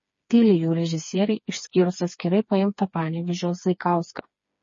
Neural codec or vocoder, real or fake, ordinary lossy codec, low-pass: codec, 16 kHz, 4 kbps, FreqCodec, smaller model; fake; MP3, 32 kbps; 7.2 kHz